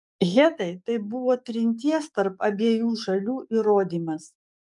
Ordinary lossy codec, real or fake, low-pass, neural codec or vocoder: MP3, 96 kbps; fake; 10.8 kHz; codec, 44.1 kHz, 7.8 kbps, DAC